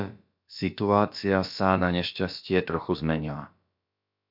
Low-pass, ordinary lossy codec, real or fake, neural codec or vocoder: 5.4 kHz; MP3, 48 kbps; fake; codec, 16 kHz, about 1 kbps, DyCAST, with the encoder's durations